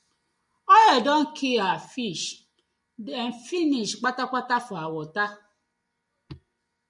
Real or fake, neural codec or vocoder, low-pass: real; none; 10.8 kHz